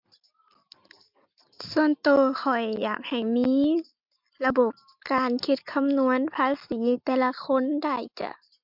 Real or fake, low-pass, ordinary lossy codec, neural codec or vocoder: real; 5.4 kHz; MP3, 48 kbps; none